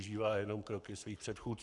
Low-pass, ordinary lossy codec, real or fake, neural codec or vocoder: 10.8 kHz; MP3, 96 kbps; fake; codec, 44.1 kHz, 7.8 kbps, Pupu-Codec